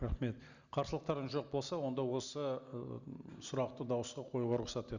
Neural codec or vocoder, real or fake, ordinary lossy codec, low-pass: none; real; Opus, 64 kbps; 7.2 kHz